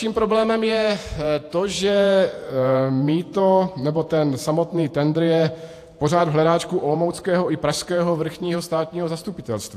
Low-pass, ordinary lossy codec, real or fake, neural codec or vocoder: 14.4 kHz; AAC, 64 kbps; fake; vocoder, 48 kHz, 128 mel bands, Vocos